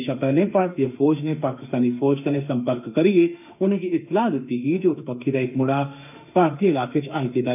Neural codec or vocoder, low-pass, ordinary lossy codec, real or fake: autoencoder, 48 kHz, 32 numbers a frame, DAC-VAE, trained on Japanese speech; 3.6 kHz; none; fake